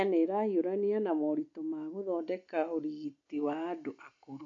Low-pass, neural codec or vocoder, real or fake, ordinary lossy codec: 7.2 kHz; none; real; AAC, 48 kbps